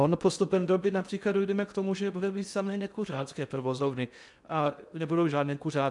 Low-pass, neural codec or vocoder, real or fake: 10.8 kHz; codec, 16 kHz in and 24 kHz out, 0.6 kbps, FocalCodec, streaming, 2048 codes; fake